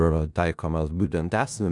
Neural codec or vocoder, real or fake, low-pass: codec, 16 kHz in and 24 kHz out, 0.4 kbps, LongCat-Audio-Codec, four codebook decoder; fake; 10.8 kHz